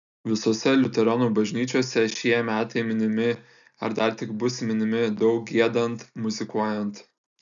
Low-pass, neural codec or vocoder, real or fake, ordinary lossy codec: 7.2 kHz; none; real; MP3, 96 kbps